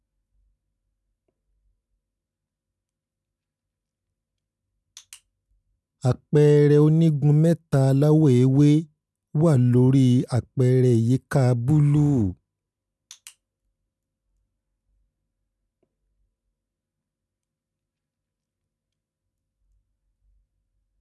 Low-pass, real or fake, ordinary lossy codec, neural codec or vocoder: none; real; none; none